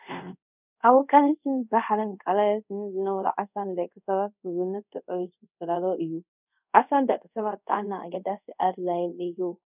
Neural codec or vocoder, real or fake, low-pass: codec, 24 kHz, 0.5 kbps, DualCodec; fake; 3.6 kHz